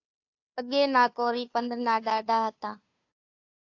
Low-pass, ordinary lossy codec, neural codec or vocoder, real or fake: 7.2 kHz; AAC, 48 kbps; codec, 16 kHz, 2 kbps, FunCodec, trained on Chinese and English, 25 frames a second; fake